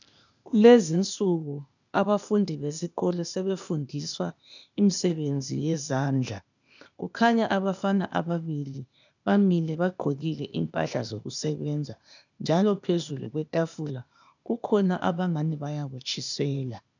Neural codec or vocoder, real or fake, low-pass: codec, 16 kHz, 0.8 kbps, ZipCodec; fake; 7.2 kHz